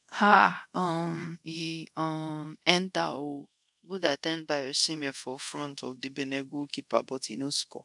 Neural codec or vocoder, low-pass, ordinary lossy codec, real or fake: codec, 24 kHz, 0.5 kbps, DualCodec; 10.8 kHz; none; fake